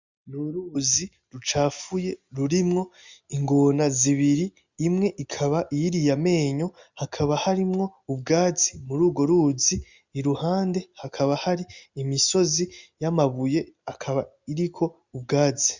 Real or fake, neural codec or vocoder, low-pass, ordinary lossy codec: real; none; 7.2 kHz; Opus, 64 kbps